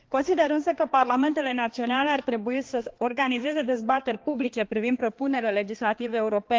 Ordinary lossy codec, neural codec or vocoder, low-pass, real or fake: Opus, 16 kbps; codec, 16 kHz, 2 kbps, X-Codec, HuBERT features, trained on balanced general audio; 7.2 kHz; fake